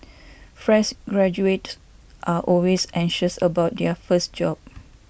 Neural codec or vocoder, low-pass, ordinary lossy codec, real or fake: none; none; none; real